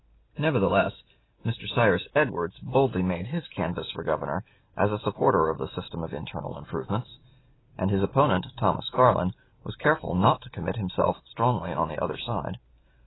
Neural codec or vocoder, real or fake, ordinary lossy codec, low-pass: none; real; AAC, 16 kbps; 7.2 kHz